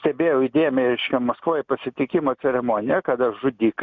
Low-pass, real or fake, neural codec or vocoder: 7.2 kHz; real; none